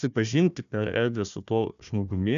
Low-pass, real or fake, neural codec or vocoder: 7.2 kHz; fake; codec, 16 kHz, 1 kbps, FunCodec, trained on Chinese and English, 50 frames a second